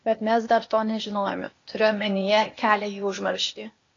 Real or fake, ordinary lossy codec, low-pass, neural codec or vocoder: fake; AAC, 32 kbps; 7.2 kHz; codec, 16 kHz, 0.8 kbps, ZipCodec